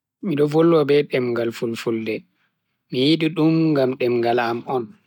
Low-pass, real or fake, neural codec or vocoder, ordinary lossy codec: 19.8 kHz; real; none; none